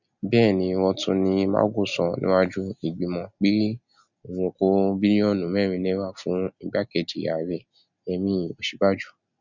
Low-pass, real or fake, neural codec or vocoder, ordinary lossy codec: 7.2 kHz; real; none; none